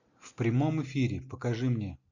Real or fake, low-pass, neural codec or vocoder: real; 7.2 kHz; none